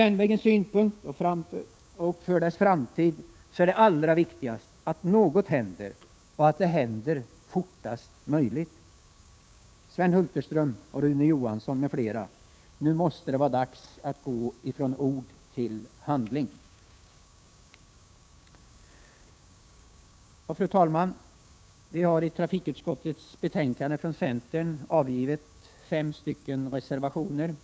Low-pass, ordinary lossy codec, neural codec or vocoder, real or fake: none; none; codec, 16 kHz, 6 kbps, DAC; fake